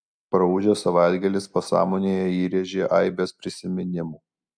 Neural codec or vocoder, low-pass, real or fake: none; 9.9 kHz; real